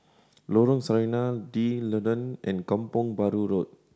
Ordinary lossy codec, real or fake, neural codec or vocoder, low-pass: none; real; none; none